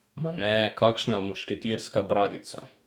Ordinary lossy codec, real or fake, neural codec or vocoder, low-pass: none; fake; codec, 44.1 kHz, 2.6 kbps, DAC; 19.8 kHz